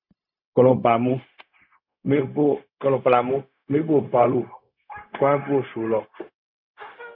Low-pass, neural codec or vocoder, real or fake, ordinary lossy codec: 5.4 kHz; codec, 16 kHz, 0.4 kbps, LongCat-Audio-Codec; fake; MP3, 48 kbps